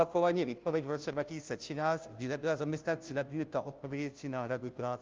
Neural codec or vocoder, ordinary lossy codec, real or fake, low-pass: codec, 16 kHz, 0.5 kbps, FunCodec, trained on Chinese and English, 25 frames a second; Opus, 24 kbps; fake; 7.2 kHz